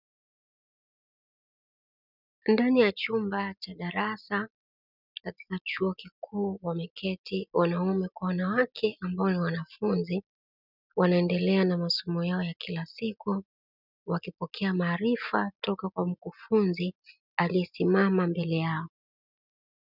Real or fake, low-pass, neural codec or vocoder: real; 5.4 kHz; none